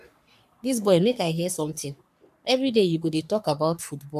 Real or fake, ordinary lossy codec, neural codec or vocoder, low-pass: fake; none; codec, 44.1 kHz, 3.4 kbps, Pupu-Codec; 14.4 kHz